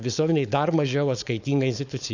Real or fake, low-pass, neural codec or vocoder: fake; 7.2 kHz; codec, 16 kHz, 4.8 kbps, FACodec